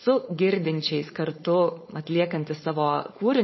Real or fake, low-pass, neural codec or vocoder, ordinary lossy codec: fake; 7.2 kHz; codec, 16 kHz, 4.8 kbps, FACodec; MP3, 24 kbps